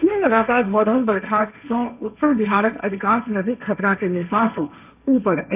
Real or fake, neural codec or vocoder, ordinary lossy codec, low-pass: fake; codec, 16 kHz, 1.1 kbps, Voila-Tokenizer; none; 3.6 kHz